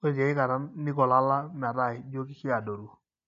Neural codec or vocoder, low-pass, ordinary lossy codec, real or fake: none; 7.2 kHz; none; real